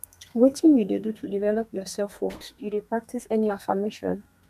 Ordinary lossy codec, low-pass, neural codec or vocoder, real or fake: none; 14.4 kHz; codec, 32 kHz, 1.9 kbps, SNAC; fake